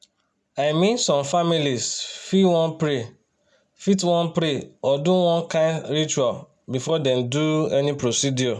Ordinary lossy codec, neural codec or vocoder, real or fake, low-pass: none; none; real; none